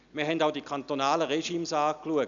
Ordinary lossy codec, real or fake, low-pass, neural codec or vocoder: none; real; 7.2 kHz; none